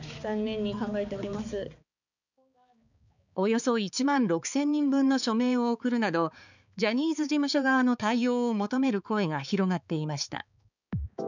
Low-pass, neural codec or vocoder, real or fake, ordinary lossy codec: 7.2 kHz; codec, 16 kHz, 4 kbps, X-Codec, HuBERT features, trained on balanced general audio; fake; none